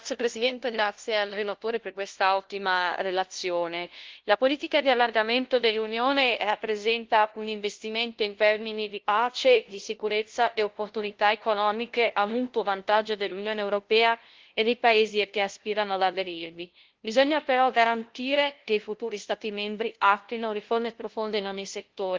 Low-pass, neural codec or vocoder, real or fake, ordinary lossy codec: 7.2 kHz; codec, 16 kHz, 0.5 kbps, FunCodec, trained on LibriTTS, 25 frames a second; fake; Opus, 16 kbps